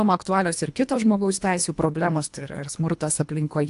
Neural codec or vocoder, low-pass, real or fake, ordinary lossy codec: codec, 24 kHz, 1.5 kbps, HILCodec; 10.8 kHz; fake; AAC, 64 kbps